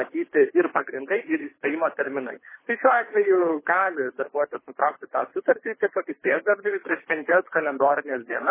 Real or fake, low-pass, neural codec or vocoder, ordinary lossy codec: fake; 3.6 kHz; codec, 24 kHz, 3 kbps, HILCodec; MP3, 16 kbps